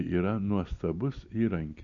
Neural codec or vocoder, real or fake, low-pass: none; real; 7.2 kHz